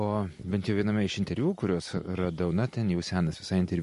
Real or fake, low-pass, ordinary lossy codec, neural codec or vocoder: real; 14.4 kHz; MP3, 48 kbps; none